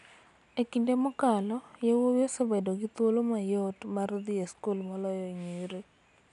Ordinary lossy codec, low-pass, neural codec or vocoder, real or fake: none; 10.8 kHz; none; real